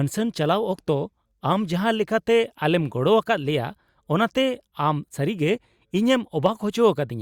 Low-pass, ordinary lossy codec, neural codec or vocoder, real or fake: 19.8 kHz; Opus, 64 kbps; none; real